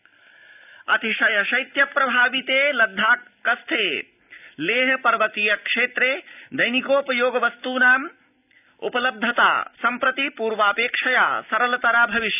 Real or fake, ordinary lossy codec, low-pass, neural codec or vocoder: real; none; 3.6 kHz; none